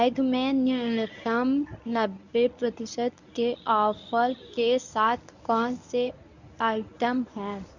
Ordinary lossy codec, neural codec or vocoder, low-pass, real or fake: none; codec, 24 kHz, 0.9 kbps, WavTokenizer, medium speech release version 1; 7.2 kHz; fake